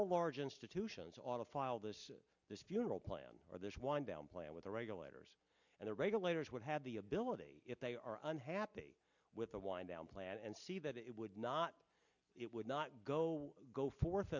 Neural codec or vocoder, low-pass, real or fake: none; 7.2 kHz; real